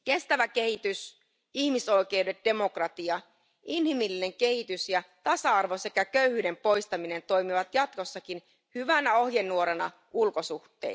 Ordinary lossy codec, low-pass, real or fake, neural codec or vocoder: none; none; real; none